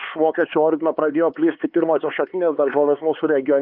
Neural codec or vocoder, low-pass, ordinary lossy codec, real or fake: codec, 16 kHz, 4 kbps, X-Codec, HuBERT features, trained on LibriSpeech; 5.4 kHz; Opus, 24 kbps; fake